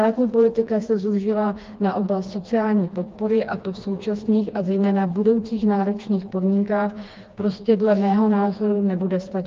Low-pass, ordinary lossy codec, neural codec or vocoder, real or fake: 7.2 kHz; Opus, 24 kbps; codec, 16 kHz, 2 kbps, FreqCodec, smaller model; fake